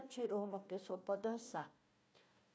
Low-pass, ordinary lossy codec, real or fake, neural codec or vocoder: none; none; fake; codec, 16 kHz, 1 kbps, FunCodec, trained on Chinese and English, 50 frames a second